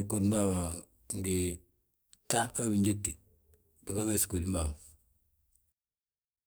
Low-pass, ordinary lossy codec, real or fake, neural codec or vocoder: none; none; fake; codec, 44.1 kHz, 2.6 kbps, SNAC